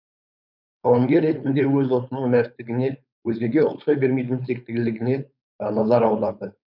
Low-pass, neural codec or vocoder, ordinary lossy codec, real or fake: 5.4 kHz; codec, 16 kHz, 4.8 kbps, FACodec; none; fake